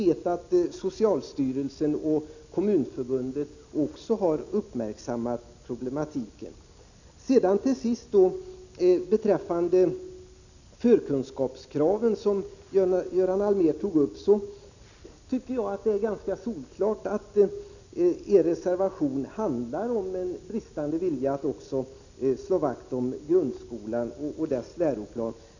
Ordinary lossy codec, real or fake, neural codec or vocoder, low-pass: none; real; none; 7.2 kHz